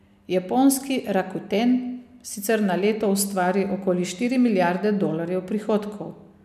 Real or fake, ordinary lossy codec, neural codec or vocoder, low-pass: real; none; none; 14.4 kHz